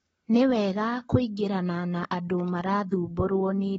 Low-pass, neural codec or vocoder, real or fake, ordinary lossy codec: 19.8 kHz; codec, 44.1 kHz, 7.8 kbps, Pupu-Codec; fake; AAC, 24 kbps